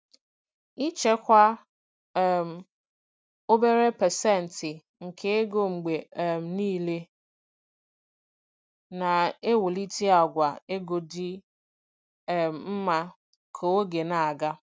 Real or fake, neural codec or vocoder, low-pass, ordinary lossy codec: real; none; none; none